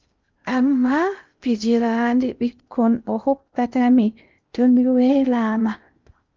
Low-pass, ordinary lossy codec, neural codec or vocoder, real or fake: 7.2 kHz; Opus, 24 kbps; codec, 16 kHz in and 24 kHz out, 0.6 kbps, FocalCodec, streaming, 2048 codes; fake